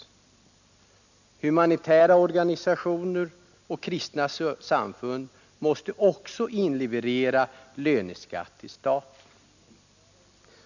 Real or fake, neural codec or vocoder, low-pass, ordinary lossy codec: real; none; 7.2 kHz; none